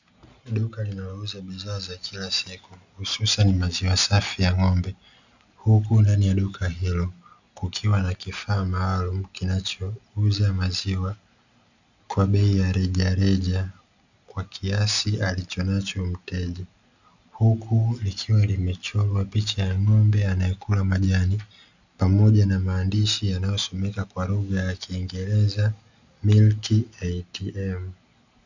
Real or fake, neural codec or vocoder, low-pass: real; none; 7.2 kHz